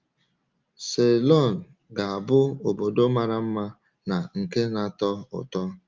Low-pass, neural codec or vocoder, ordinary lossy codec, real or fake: 7.2 kHz; none; Opus, 32 kbps; real